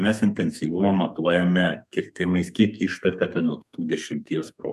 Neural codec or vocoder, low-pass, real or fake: codec, 32 kHz, 1.9 kbps, SNAC; 14.4 kHz; fake